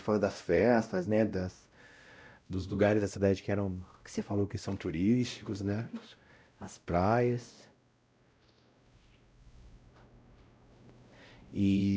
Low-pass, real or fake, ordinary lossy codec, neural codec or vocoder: none; fake; none; codec, 16 kHz, 0.5 kbps, X-Codec, WavLM features, trained on Multilingual LibriSpeech